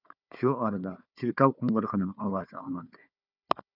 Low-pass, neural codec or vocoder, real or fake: 5.4 kHz; codec, 16 kHz, 4 kbps, FunCodec, trained on Chinese and English, 50 frames a second; fake